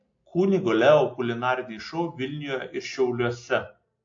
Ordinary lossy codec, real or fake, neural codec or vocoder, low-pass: AAC, 48 kbps; real; none; 7.2 kHz